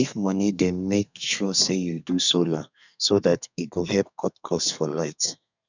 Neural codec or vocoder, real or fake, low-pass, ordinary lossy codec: codec, 32 kHz, 1.9 kbps, SNAC; fake; 7.2 kHz; none